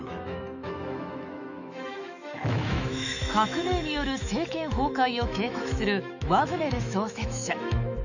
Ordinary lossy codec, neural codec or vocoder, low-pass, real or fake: none; autoencoder, 48 kHz, 128 numbers a frame, DAC-VAE, trained on Japanese speech; 7.2 kHz; fake